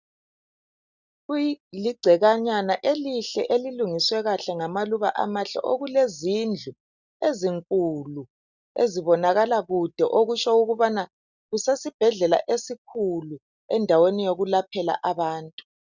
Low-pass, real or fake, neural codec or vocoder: 7.2 kHz; real; none